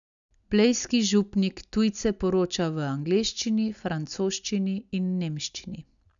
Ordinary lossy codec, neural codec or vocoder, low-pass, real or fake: none; none; 7.2 kHz; real